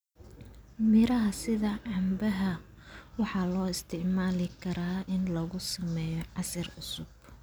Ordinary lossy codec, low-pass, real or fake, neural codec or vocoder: none; none; real; none